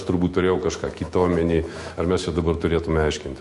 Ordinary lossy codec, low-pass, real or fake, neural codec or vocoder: MP3, 48 kbps; 14.4 kHz; fake; autoencoder, 48 kHz, 128 numbers a frame, DAC-VAE, trained on Japanese speech